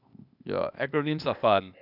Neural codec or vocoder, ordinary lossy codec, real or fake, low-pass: codec, 16 kHz, 0.8 kbps, ZipCodec; none; fake; 5.4 kHz